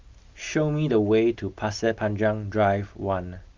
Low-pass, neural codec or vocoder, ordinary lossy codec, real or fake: 7.2 kHz; none; Opus, 32 kbps; real